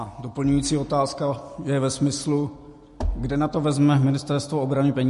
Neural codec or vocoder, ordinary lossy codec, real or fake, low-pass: none; MP3, 48 kbps; real; 14.4 kHz